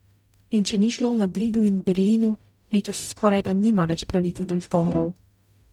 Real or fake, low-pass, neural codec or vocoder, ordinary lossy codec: fake; 19.8 kHz; codec, 44.1 kHz, 0.9 kbps, DAC; none